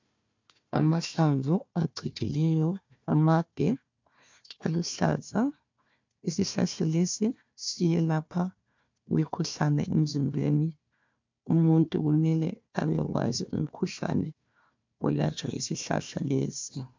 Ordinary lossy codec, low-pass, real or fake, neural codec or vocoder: MP3, 64 kbps; 7.2 kHz; fake; codec, 16 kHz, 1 kbps, FunCodec, trained on Chinese and English, 50 frames a second